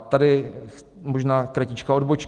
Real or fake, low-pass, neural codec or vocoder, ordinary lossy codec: real; 10.8 kHz; none; Opus, 24 kbps